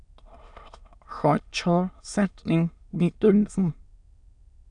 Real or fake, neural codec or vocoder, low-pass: fake; autoencoder, 22.05 kHz, a latent of 192 numbers a frame, VITS, trained on many speakers; 9.9 kHz